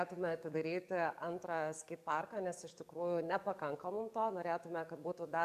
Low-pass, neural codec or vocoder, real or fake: 14.4 kHz; codec, 44.1 kHz, 7.8 kbps, DAC; fake